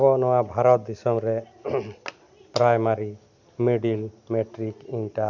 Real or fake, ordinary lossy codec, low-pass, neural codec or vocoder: real; none; 7.2 kHz; none